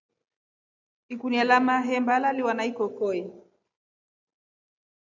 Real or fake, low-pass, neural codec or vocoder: real; 7.2 kHz; none